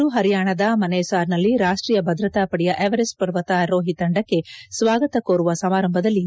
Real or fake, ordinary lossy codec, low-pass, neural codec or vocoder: real; none; none; none